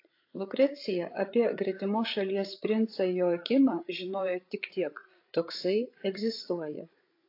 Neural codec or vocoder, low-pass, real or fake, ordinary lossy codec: codec, 16 kHz, 8 kbps, FreqCodec, larger model; 5.4 kHz; fake; AAC, 32 kbps